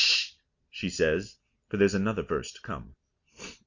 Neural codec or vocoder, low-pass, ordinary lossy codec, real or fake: none; 7.2 kHz; Opus, 64 kbps; real